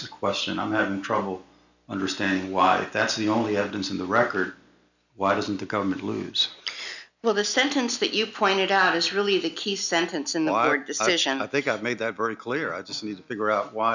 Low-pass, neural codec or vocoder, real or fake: 7.2 kHz; none; real